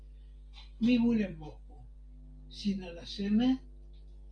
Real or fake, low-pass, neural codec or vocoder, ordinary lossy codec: real; 9.9 kHz; none; Opus, 32 kbps